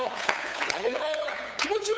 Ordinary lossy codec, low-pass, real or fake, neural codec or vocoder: none; none; fake; codec, 16 kHz, 8 kbps, FunCodec, trained on LibriTTS, 25 frames a second